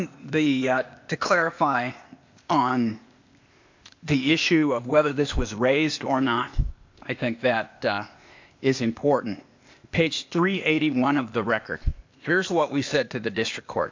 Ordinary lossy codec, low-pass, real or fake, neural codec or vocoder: MP3, 64 kbps; 7.2 kHz; fake; codec, 16 kHz, 0.8 kbps, ZipCodec